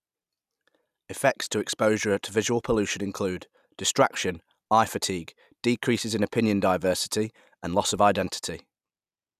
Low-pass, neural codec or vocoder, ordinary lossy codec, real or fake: 14.4 kHz; none; none; real